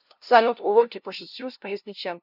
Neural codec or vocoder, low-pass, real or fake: codec, 16 kHz, 0.5 kbps, FunCodec, trained on LibriTTS, 25 frames a second; 5.4 kHz; fake